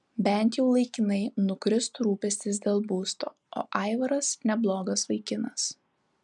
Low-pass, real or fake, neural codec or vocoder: 10.8 kHz; real; none